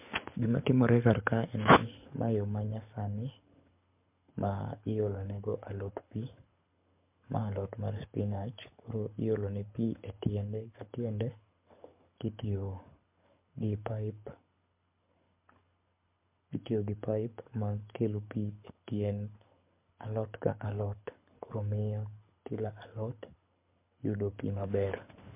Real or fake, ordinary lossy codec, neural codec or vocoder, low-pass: fake; MP3, 32 kbps; codec, 24 kHz, 6 kbps, HILCodec; 3.6 kHz